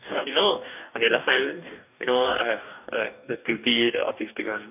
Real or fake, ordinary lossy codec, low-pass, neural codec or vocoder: fake; none; 3.6 kHz; codec, 44.1 kHz, 2.6 kbps, DAC